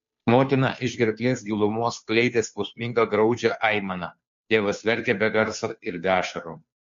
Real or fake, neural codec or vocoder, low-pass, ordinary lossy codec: fake; codec, 16 kHz, 2 kbps, FunCodec, trained on Chinese and English, 25 frames a second; 7.2 kHz; MP3, 48 kbps